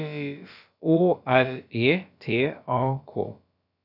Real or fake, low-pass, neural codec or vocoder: fake; 5.4 kHz; codec, 16 kHz, about 1 kbps, DyCAST, with the encoder's durations